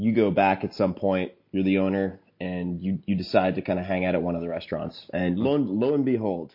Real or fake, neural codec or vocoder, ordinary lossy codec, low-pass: real; none; MP3, 32 kbps; 5.4 kHz